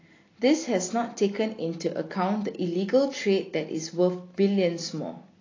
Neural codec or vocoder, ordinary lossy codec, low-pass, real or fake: none; AAC, 32 kbps; 7.2 kHz; real